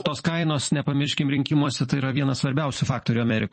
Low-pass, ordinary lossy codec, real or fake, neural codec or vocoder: 10.8 kHz; MP3, 32 kbps; fake; vocoder, 44.1 kHz, 128 mel bands every 256 samples, BigVGAN v2